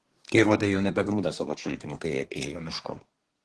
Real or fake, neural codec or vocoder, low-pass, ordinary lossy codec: fake; codec, 24 kHz, 1 kbps, SNAC; 10.8 kHz; Opus, 16 kbps